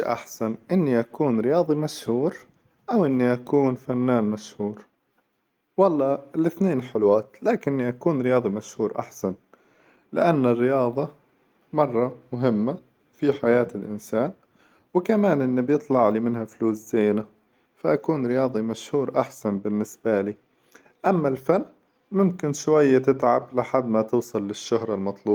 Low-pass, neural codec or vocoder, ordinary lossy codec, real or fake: 19.8 kHz; none; Opus, 32 kbps; real